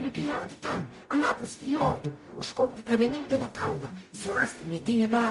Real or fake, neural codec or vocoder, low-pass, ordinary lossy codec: fake; codec, 44.1 kHz, 0.9 kbps, DAC; 14.4 kHz; MP3, 48 kbps